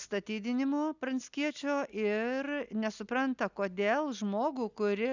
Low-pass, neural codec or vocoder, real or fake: 7.2 kHz; none; real